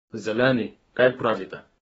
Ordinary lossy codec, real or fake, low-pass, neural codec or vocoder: AAC, 24 kbps; fake; 14.4 kHz; codec, 32 kHz, 1.9 kbps, SNAC